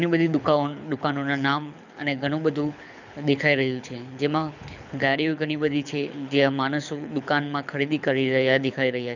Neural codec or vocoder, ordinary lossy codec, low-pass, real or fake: codec, 24 kHz, 6 kbps, HILCodec; none; 7.2 kHz; fake